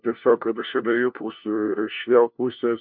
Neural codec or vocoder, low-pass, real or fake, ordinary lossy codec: codec, 16 kHz, 1 kbps, FunCodec, trained on LibriTTS, 50 frames a second; 5.4 kHz; fake; MP3, 48 kbps